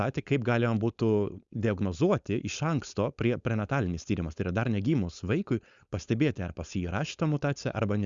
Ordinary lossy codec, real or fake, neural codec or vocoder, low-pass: Opus, 64 kbps; fake; codec, 16 kHz, 4.8 kbps, FACodec; 7.2 kHz